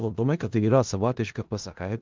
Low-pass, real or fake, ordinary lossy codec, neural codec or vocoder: 7.2 kHz; fake; Opus, 24 kbps; codec, 16 kHz in and 24 kHz out, 0.4 kbps, LongCat-Audio-Codec, four codebook decoder